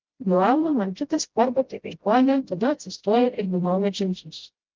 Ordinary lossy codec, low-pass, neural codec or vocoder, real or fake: Opus, 24 kbps; 7.2 kHz; codec, 16 kHz, 0.5 kbps, FreqCodec, smaller model; fake